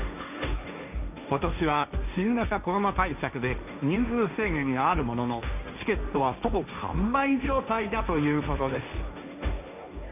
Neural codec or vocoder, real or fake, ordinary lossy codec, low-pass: codec, 16 kHz, 1.1 kbps, Voila-Tokenizer; fake; none; 3.6 kHz